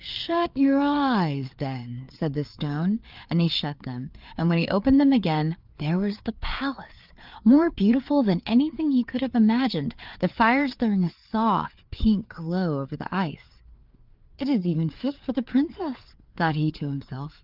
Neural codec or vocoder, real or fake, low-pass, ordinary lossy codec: codec, 16 kHz, 4 kbps, FreqCodec, larger model; fake; 5.4 kHz; Opus, 32 kbps